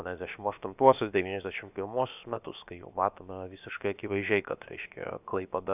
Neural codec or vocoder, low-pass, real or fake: codec, 16 kHz, about 1 kbps, DyCAST, with the encoder's durations; 3.6 kHz; fake